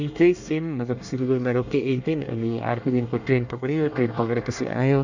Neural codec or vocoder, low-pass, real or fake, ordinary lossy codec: codec, 24 kHz, 1 kbps, SNAC; 7.2 kHz; fake; none